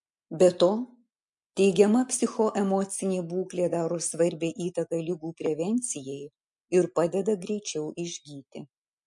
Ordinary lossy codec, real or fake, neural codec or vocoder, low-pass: MP3, 48 kbps; real; none; 10.8 kHz